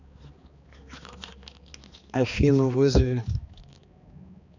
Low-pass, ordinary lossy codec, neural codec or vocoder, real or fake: 7.2 kHz; none; codec, 16 kHz, 2 kbps, X-Codec, HuBERT features, trained on balanced general audio; fake